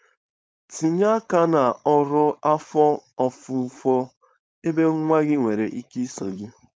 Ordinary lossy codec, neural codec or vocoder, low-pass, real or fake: none; codec, 16 kHz, 4.8 kbps, FACodec; none; fake